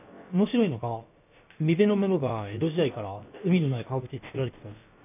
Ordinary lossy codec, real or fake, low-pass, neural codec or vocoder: none; fake; 3.6 kHz; codec, 16 kHz, about 1 kbps, DyCAST, with the encoder's durations